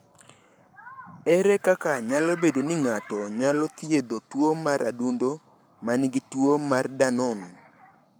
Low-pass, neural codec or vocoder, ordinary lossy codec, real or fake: none; codec, 44.1 kHz, 7.8 kbps, Pupu-Codec; none; fake